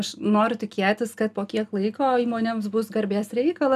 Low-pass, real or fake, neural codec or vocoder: 14.4 kHz; real; none